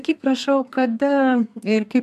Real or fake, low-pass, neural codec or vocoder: fake; 14.4 kHz; codec, 32 kHz, 1.9 kbps, SNAC